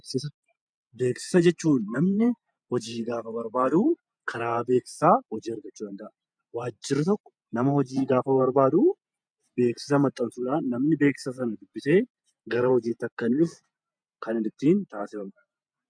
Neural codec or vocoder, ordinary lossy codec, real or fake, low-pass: vocoder, 48 kHz, 128 mel bands, Vocos; MP3, 96 kbps; fake; 9.9 kHz